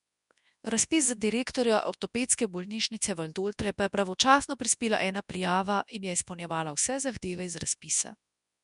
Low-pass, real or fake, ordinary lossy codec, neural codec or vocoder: 10.8 kHz; fake; none; codec, 24 kHz, 0.9 kbps, WavTokenizer, large speech release